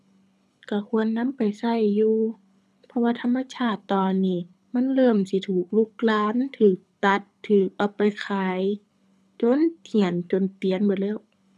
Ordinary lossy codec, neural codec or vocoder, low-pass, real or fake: none; codec, 24 kHz, 6 kbps, HILCodec; none; fake